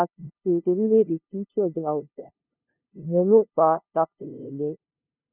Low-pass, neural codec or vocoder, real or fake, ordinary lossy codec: 3.6 kHz; codec, 16 kHz, 0.5 kbps, FunCodec, trained on LibriTTS, 25 frames a second; fake; none